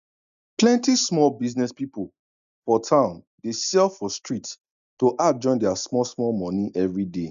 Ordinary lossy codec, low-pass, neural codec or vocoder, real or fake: MP3, 96 kbps; 7.2 kHz; none; real